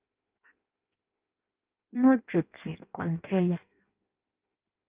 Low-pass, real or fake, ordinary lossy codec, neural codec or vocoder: 3.6 kHz; fake; Opus, 24 kbps; codec, 16 kHz in and 24 kHz out, 0.6 kbps, FireRedTTS-2 codec